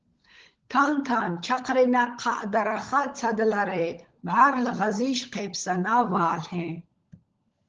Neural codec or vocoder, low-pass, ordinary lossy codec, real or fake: codec, 16 kHz, 16 kbps, FunCodec, trained on LibriTTS, 50 frames a second; 7.2 kHz; Opus, 16 kbps; fake